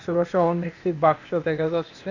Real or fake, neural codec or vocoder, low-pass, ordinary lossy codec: fake; codec, 16 kHz, 0.8 kbps, ZipCodec; 7.2 kHz; none